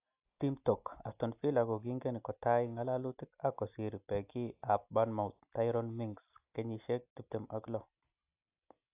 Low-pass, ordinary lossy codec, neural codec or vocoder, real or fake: 3.6 kHz; AAC, 32 kbps; none; real